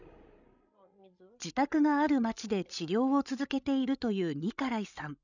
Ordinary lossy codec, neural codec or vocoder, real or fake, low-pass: none; codec, 16 kHz, 16 kbps, FreqCodec, larger model; fake; 7.2 kHz